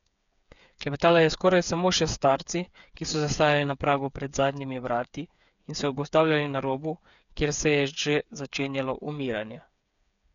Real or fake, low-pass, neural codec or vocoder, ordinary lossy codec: fake; 7.2 kHz; codec, 16 kHz, 8 kbps, FreqCodec, smaller model; none